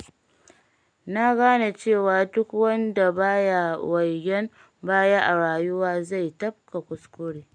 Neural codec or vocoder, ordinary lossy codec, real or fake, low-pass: none; none; real; 9.9 kHz